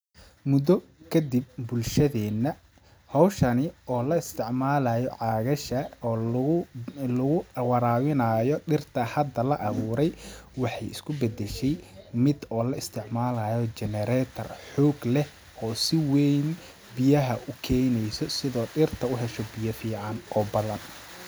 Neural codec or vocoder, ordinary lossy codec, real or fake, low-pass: none; none; real; none